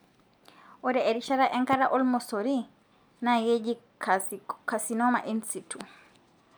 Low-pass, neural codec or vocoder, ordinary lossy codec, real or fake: none; none; none; real